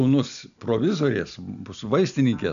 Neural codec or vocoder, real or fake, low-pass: none; real; 7.2 kHz